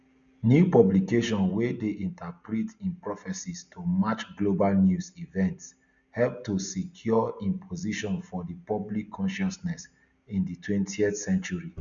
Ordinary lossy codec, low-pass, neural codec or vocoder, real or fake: none; 7.2 kHz; none; real